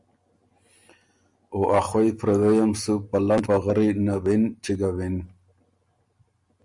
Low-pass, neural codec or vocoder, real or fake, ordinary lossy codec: 10.8 kHz; none; real; Opus, 64 kbps